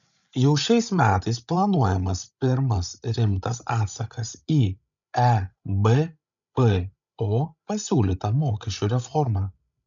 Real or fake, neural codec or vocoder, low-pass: fake; codec, 16 kHz, 16 kbps, FreqCodec, larger model; 7.2 kHz